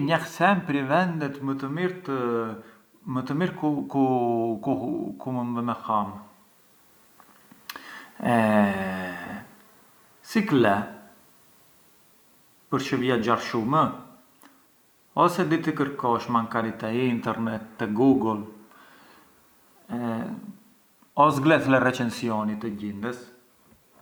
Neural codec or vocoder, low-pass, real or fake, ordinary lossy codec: none; none; real; none